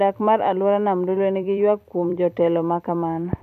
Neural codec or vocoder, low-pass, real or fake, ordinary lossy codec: vocoder, 44.1 kHz, 128 mel bands every 256 samples, BigVGAN v2; 14.4 kHz; fake; none